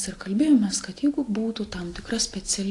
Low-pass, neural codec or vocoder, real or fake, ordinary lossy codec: 10.8 kHz; none; real; AAC, 48 kbps